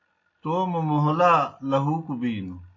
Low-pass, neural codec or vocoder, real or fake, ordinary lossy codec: 7.2 kHz; none; real; AAC, 32 kbps